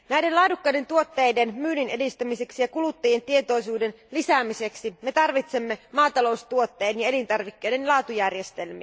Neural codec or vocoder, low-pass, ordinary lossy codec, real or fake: none; none; none; real